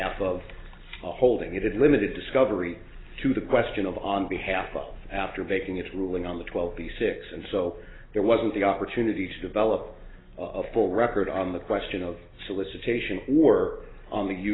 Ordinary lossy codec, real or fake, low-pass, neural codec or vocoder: AAC, 16 kbps; real; 7.2 kHz; none